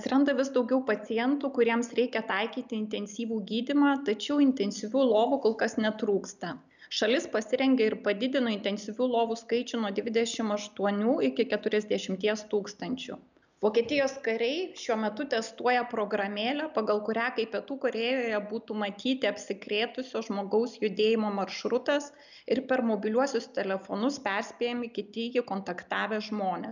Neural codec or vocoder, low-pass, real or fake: none; 7.2 kHz; real